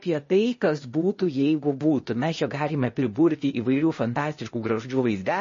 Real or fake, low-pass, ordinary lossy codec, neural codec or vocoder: fake; 7.2 kHz; MP3, 32 kbps; codec, 16 kHz, 0.8 kbps, ZipCodec